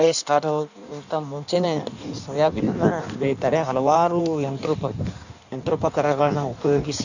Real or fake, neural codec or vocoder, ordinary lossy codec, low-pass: fake; codec, 16 kHz in and 24 kHz out, 1.1 kbps, FireRedTTS-2 codec; none; 7.2 kHz